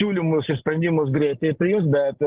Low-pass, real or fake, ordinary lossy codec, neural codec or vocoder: 3.6 kHz; real; Opus, 16 kbps; none